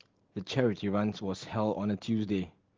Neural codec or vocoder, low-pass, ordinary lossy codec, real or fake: none; 7.2 kHz; Opus, 16 kbps; real